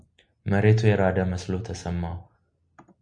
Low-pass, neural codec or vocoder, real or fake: 9.9 kHz; none; real